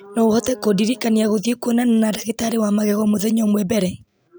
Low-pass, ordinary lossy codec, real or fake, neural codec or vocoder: none; none; real; none